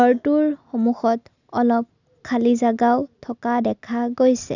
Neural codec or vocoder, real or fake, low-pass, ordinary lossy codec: none; real; 7.2 kHz; none